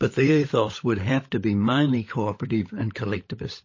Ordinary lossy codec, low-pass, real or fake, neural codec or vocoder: MP3, 32 kbps; 7.2 kHz; fake; codec, 16 kHz, 16 kbps, FunCodec, trained on LibriTTS, 50 frames a second